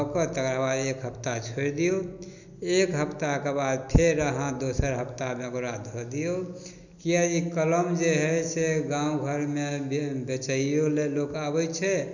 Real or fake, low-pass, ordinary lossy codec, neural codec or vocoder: real; 7.2 kHz; none; none